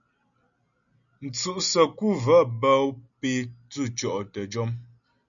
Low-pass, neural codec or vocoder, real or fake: 7.2 kHz; none; real